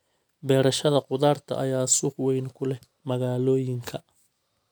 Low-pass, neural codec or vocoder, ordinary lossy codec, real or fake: none; none; none; real